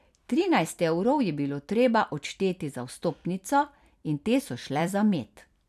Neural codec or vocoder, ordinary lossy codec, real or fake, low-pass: vocoder, 44.1 kHz, 128 mel bands every 256 samples, BigVGAN v2; none; fake; 14.4 kHz